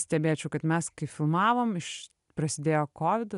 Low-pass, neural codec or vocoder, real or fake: 10.8 kHz; none; real